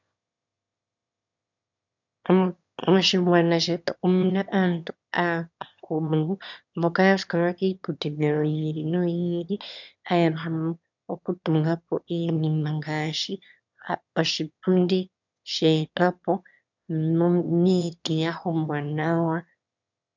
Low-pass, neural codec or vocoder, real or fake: 7.2 kHz; autoencoder, 22.05 kHz, a latent of 192 numbers a frame, VITS, trained on one speaker; fake